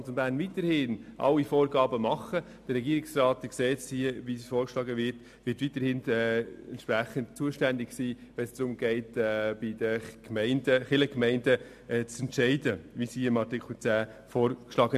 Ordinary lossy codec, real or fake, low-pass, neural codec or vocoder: AAC, 96 kbps; real; 14.4 kHz; none